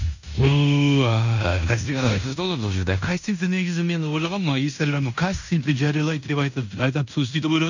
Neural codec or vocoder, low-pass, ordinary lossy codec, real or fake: codec, 16 kHz in and 24 kHz out, 0.9 kbps, LongCat-Audio-Codec, fine tuned four codebook decoder; 7.2 kHz; none; fake